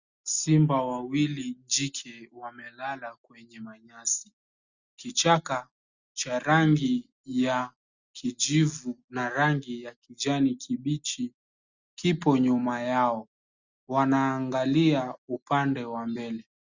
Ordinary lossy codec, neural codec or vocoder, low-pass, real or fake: Opus, 64 kbps; none; 7.2 kHz; real